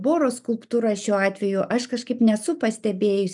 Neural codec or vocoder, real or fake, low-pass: none; real; 10.8 kHz